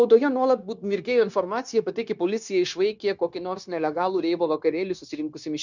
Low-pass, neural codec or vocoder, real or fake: 7.2 kHz; codec, 16 kHz, 0.9 kbps, LongCat-Audio-Codec; fake